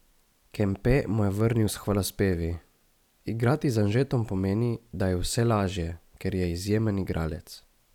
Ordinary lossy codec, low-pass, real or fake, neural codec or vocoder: none; 19.8 kHz; fake; vocoder, 44.1 kHz, 128 mel bands every 256 samples, BigVGAN v2